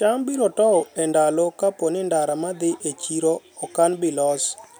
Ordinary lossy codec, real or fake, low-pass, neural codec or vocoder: none; real; none; none